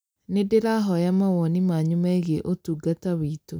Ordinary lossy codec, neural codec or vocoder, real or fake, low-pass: none; none; real; none